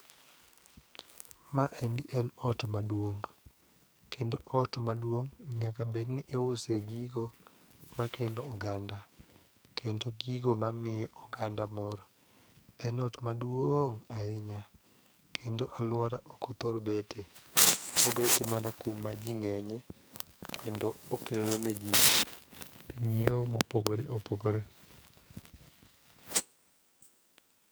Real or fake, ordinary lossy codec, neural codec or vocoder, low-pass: fake; none; codec, 44.1 kHz, 2.6 kbps, SNAC; none